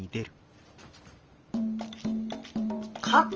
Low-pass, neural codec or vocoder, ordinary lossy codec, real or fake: 7.2 kHz; vocoder, 44.1 kHz, 128 mel bands every 512 samples, BigVGAN v2; Opus, 24 kbps; fake